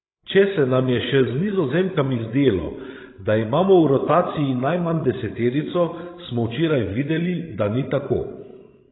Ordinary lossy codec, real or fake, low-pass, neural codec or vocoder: AAC, 16 kbps; fake; 7.2 kHz; codec, 16 kHz, 16 kbps, FreqCodec, larger model